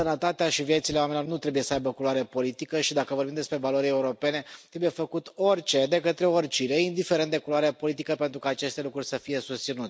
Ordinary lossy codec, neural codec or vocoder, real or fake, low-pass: none; none; real; none